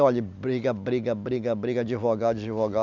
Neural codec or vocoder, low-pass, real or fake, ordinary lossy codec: none; 7.2 kHz; real; none